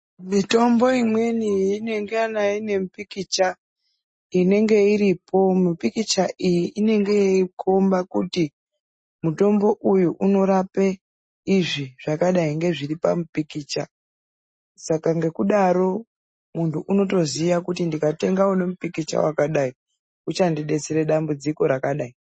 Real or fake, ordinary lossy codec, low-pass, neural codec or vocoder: real; MP3, 32 kbps; 9.9 kHz; none